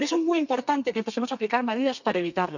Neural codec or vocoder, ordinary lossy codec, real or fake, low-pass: codec, 32 kHz, 1.9 kbps, SNAC; none; fake; 7.2 kHz